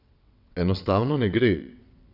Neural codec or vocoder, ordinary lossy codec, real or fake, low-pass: vocoder, 22.05 kHz, 80 mel bands, WaveNeXt; none; fake; 5.4 kHz